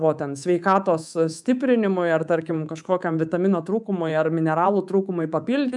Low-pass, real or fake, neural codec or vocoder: 10.8 kHz; fake; codec, 24 kHz, 3.1 kbps, DualCodec